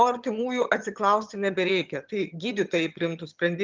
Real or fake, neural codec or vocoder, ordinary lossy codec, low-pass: fake; vocoder, 22.05 kHz, 80 mel bands, HiFi-GAN; Opus, 32 kbps; 7.2 kHz